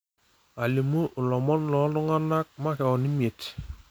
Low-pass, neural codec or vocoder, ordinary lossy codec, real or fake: none; none; none; real